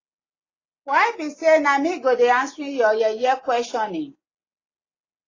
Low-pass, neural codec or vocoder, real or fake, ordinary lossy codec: 7.2 kHz; none; real; AAC, 32 kbps